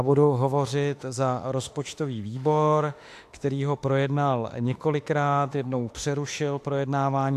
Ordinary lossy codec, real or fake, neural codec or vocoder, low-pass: AAC, 64 kbps; fake; autoencoder, 48 kHz, 32 numbers a frame, DAC-VAE, trained on Japanese speech; 14.4 kHz